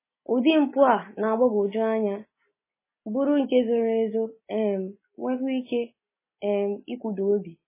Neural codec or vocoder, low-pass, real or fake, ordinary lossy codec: none; 3.6 kHz; real; MP3, 16 kbps